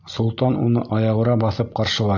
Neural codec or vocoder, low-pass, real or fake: none; 7.2 kHz; real